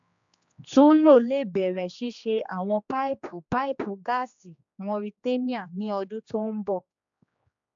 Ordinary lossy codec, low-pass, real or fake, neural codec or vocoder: AAC, 64 kbps; 7.2 kHz; fake; codec, 16 kHz, 2 kbps, X-Codec, HuBERT features, trained on general audio